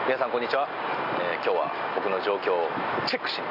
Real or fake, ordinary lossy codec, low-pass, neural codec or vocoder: real; none; 5.4 kHz; none